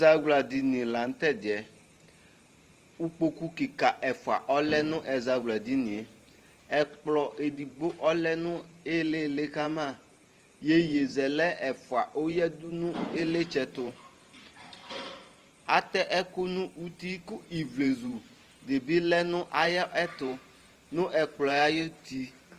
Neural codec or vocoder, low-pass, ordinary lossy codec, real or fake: vocoder, 44.1 kHz, 128 mel bands every 512 samples, BigVGAN v2; 14.4 kHz; Opus, 24 kbps; fake